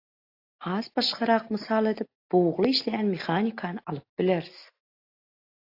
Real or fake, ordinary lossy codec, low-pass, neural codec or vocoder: real; AAC, 32 kbps; 5.4 kHz; none